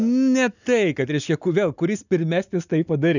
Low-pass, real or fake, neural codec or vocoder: 7.2 kHz; real; none